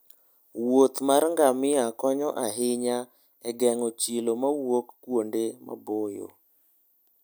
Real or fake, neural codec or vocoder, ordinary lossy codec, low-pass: real; none; none; none